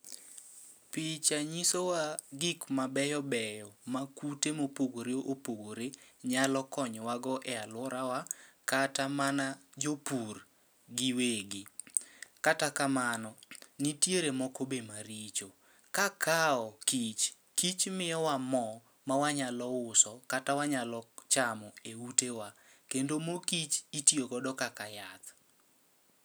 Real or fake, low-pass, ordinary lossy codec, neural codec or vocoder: real; none; none; none